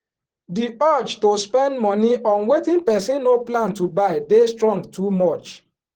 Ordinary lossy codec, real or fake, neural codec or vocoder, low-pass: Opus, 16 kbps; fake; vocoder, 44.1 kHz, 128 mel bands, Pupu-Vocoder; 19.8 kHz